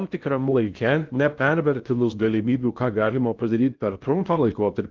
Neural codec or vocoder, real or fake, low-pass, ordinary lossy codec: codec, 16 kHz in and 24 kHz out, 0.6 kbps, FocalCodec, streaming, 2048 codes; fake; 7.2 kHz; Opus, 24 kbps